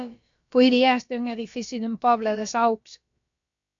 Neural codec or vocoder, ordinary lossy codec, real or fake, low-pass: codec, 16 kHz, about 1 kbps, DyCAST, with the encoder's durations; MP3, 64 kbps; fake; 7.2 kHz